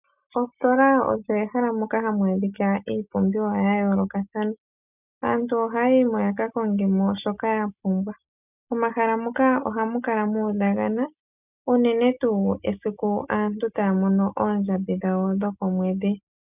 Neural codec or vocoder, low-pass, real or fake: none; 3.6 kHz; real